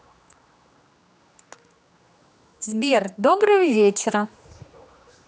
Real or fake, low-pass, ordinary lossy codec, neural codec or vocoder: fake; none; none; codec, 16 kHz, 2 kbps, X-Codec, HuBERT features, trained on general audio